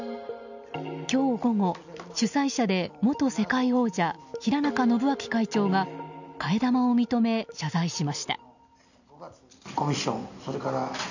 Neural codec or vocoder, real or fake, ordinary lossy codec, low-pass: none; real; none; 7.2 kHz